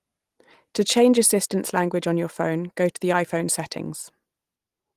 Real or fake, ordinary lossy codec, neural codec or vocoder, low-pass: real; Opus, 24 kbps; none; 14.4 kHz